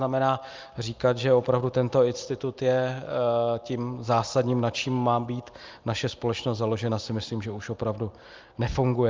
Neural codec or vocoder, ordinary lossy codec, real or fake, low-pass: none; Opus, 24 kbps; real; 7.2 kHz